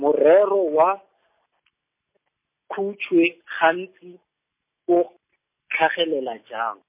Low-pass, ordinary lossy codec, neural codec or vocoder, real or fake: 3.6 kHz; MP3, 24 kbps; none; real